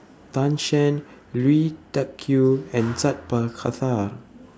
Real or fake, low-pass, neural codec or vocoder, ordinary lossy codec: real; none; none; none